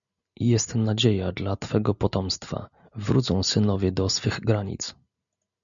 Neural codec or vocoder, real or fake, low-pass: none; real; 7.2 kHz